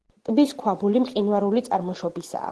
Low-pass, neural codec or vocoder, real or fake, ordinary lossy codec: 10.8 kHz; none; real; Opus, 16 kbps